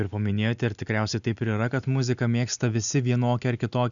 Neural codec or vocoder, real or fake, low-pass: none; real; 7.2 kHz